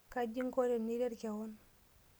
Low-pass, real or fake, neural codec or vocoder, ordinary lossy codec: none; real; none; none